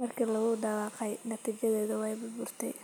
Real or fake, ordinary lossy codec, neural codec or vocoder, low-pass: real; none; none; none